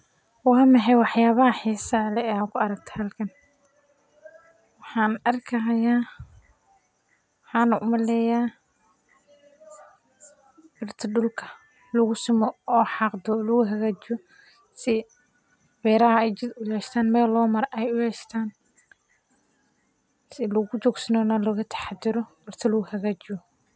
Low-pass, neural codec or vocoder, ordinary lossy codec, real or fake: none; none; none; real